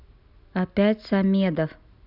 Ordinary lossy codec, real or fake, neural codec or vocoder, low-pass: none; real; none; 5.4 kHz